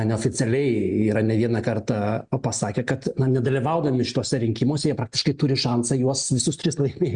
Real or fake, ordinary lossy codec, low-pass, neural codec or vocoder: real; Opus, 64 kbps; 9.9 kHz; none